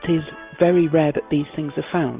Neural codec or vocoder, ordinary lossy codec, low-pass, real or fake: none; Opus, 32 kbps; 3.6 kHz; real